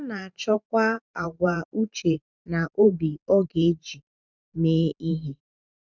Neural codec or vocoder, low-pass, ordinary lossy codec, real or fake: vocoder, 44.1 kHz, 128 mel bands every 512 samples, BigVGAN v2; 7.2 kHz; none; fake